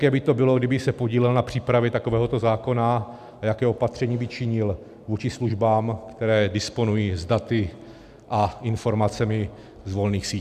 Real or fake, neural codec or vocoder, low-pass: real; none; 14.4 kHz